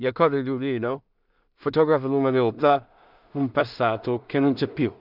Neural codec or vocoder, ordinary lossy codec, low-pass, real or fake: codec, 16 kHz in and 24 kHz out, 0.4 kbps, LongCat-Audio-Codec, two codebook decoder; none; 5.4 kHz; fake